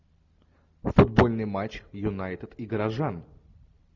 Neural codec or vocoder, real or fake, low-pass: none; real; 7.2 kHz